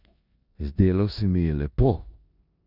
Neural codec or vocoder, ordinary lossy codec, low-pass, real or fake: codec, 16 kHz in and 24 kHz out, 0.9 kbps, LongCat-Audio-Codec, four codebook decoder; none; 5.4 kHz; fake